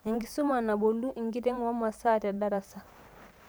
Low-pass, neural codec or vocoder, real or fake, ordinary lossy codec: none; vocoder, 44.1 kHz, 128 mel bands every 512 samples, BigVGAN v2; fake; none